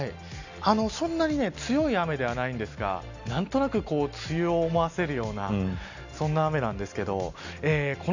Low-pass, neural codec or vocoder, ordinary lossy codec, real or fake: 7.2 kHz; none; none; real